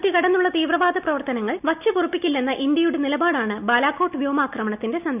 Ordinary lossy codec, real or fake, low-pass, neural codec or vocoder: none; real; 3.6 kHz; none